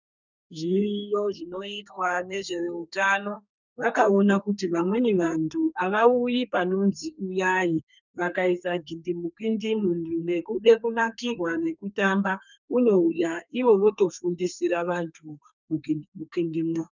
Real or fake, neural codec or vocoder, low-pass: fake; codec, 32 kHz, 1.9 kbps, SNAC; 7.2 kHz